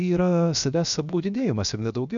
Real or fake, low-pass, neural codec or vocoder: fake; 7.2 kHz; codec, 16 kHz, 0.7 kbps, FocalCodec